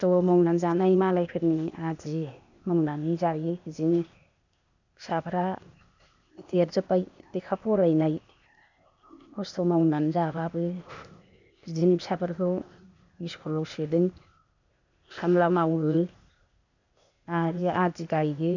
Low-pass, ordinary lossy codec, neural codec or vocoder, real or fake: 7.2 kHz; none; codec, 16 kHz, 0.8 kbps, ZipCodec; fake